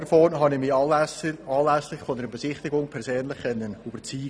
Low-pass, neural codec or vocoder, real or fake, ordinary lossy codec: none; none; real; none